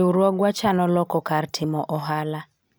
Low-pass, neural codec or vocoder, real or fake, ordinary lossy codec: none; none; real; none